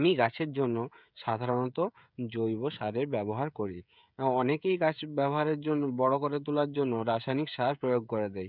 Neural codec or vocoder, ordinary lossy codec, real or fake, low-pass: codec, 16 kHz, 16 kbps, FreqCodec, smaller model; none; fake; 5.4 kHz